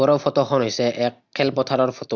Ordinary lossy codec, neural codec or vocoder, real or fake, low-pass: Opus, 64 kbps; none; real; 7.2 kHz